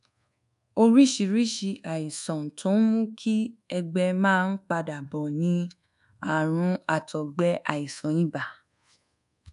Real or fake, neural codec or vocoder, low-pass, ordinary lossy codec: fake; codec, 24 kHz, 1.2 kbps, DualCodec; 10.8 kHz; none